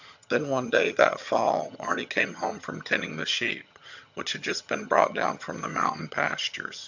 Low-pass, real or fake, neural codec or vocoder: 7.2 kHz; fake; vocoder, 22.05 kHz, 80 mel bands, HiFi-GAN